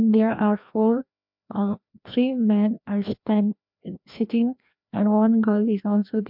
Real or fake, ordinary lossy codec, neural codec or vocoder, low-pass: fake; MP3, 48 kbps; codec, 16 kHz, 1 kbps, FreqCodec, larger model; 5.4 kHz